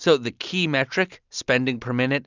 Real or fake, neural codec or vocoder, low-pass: real; none; 7.2 kHz